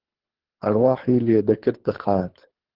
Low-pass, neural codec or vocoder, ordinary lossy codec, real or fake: 5.4 kHz; codec, 24 kHz, 3 kbps, HILCodec; Opus, 16 kbps; fake